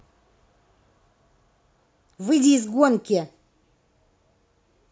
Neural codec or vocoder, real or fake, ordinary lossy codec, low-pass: none; real; none; none